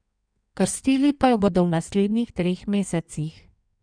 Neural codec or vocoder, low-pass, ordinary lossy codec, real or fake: codec, 16 kHz in and 24 kHz out, 1.1 kbps, FireRedTTS-2 codec; 9.9 kHz; none; fake